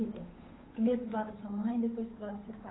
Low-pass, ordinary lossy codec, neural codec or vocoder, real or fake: 7.2 kHz; AAC, 16 kbps; codec, 16 kHz, 8 kbps, FunCodec, trained on Chinese and English, 25 frames a second; fake